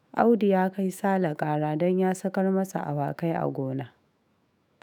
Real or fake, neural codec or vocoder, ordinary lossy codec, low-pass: fake; autoencoder, 48 kHz, 128 numbers a frame, DAC-VAE, trained on Japanese speech; none; none